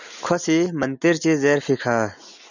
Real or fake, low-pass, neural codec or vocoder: real; 7.2 kHz; none